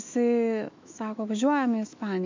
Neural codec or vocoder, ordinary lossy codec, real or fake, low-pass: autoencoder, 48 kHz, 128 numbers a frame, DAC-VAE, trained on Japanese speech; MP3, 48 kbps; fake; 7.2 kHz